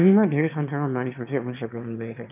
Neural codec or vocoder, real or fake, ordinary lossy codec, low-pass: autoencoder, 22.05 kHz, a latent of 192 numbers a frame, VITS, trained on one speaker; fake; none; 3.6 kHz